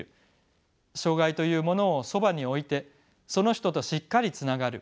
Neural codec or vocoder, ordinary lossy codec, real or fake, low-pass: none; none; real; none